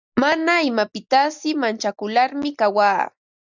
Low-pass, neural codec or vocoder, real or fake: 7.2 kHz; none; real